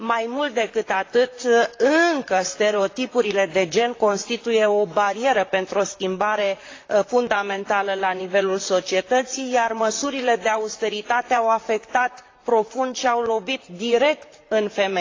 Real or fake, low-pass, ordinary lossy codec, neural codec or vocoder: fake; 7.2 kHz; AAC, 32 kbps; vocoder, 44.1 kHz, 128 mel bands, Pupu-Vocoder